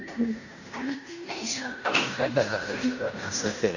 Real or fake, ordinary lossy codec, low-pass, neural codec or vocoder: fake; none; 7.2 kHz; codec, 16 kHz in and 24 kHz out, 0.9 kbps, LongCat-Audio-Codec, fine tuned four codebook decoder